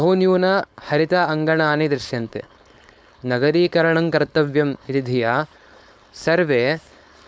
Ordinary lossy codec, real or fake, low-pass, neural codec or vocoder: none; fake; none; codec, 16 kHz, 4.8 kbps, FACodec